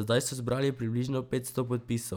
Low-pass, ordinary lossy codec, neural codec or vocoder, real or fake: none; none; none; real